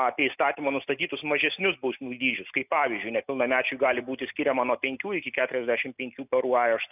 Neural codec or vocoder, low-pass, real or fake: none; 3.6 kHz; real